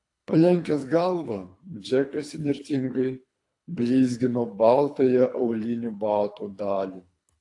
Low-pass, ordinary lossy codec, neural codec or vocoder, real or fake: 10.8 kHz; AAC, 48 kbps; codec, 24 kHz, 3 kbps, HILCodec; fake